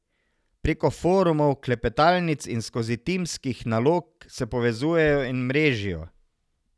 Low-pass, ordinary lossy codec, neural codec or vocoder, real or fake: none; none; none; real